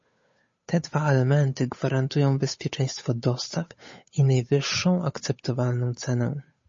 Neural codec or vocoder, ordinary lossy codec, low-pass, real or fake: codec, 16 kHz, 8 kbps, FunCodec, trained on Chinese and English, 25 frames a second; MP3, 32 kbps; 7.2 kHz; fake